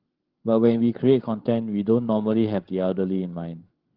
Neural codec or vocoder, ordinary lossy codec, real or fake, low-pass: codec, 16 kHz, 16 kbps, FreqCodec, larger model; Opus, 16 kbps; fake; 5.4 kHz